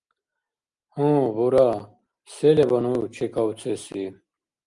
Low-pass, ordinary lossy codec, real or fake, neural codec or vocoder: 10.8 kHz; Opus, 32 kbps; real; none